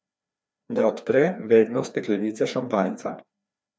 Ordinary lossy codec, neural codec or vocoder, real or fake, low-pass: none; codec, 16 kHz, 2 kbps, FreqCodec, larger model; fake; none